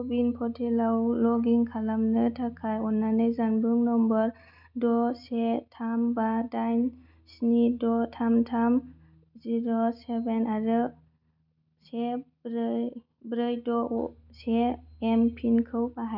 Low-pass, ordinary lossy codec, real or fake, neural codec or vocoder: 5.4 kHz; none; real; none